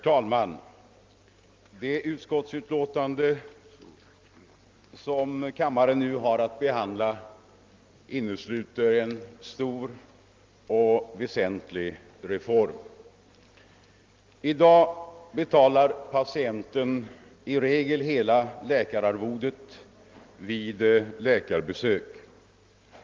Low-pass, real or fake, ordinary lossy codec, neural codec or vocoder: 7.2 kHz; real; Opus, 32 kbps; none